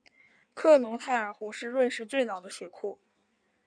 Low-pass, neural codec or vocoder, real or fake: 9.9 kHz; codec, 16 kHz in and 24 kHz out, 1.1 kbps, FireRedTTS-2 codec; fake